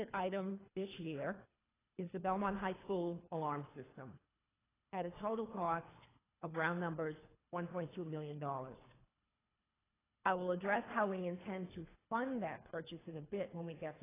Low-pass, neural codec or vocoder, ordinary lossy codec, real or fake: 3.6 kHz; codec, 24 kHz, 3 kbps, HILCodec; AAC, 16 kbps; fake